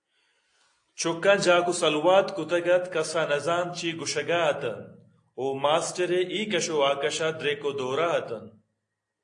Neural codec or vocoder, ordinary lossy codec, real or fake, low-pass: none; AAC, 48 kbps; real; 9.9 kHz